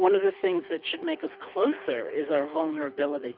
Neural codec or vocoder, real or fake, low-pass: codec, 24 kHz, 3 kbps, HILCodec; fake; 5.4 kHz